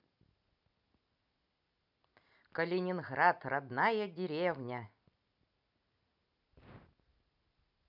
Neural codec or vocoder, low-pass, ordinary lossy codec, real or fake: none; 5.4 kHz; none; real